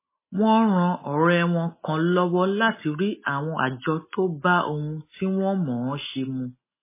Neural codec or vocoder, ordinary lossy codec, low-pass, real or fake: none; MP3, 16 kbps; 3.6 kHz; real